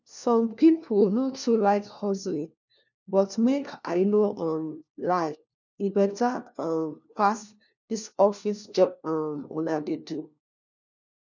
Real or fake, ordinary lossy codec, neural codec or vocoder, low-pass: fake; none; codec, 16 kHz, 1 kbps, FunCodec, trained on LibriTTS, 50 frames a second; 7.2 kHz